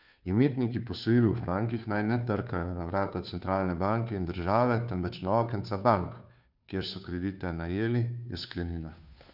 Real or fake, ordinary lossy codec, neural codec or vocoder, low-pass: fake; none; codec, 16 kHz, 2 kbps, FunCodec, trained on Chinese and English, 25 frames a second; 5.4 kHz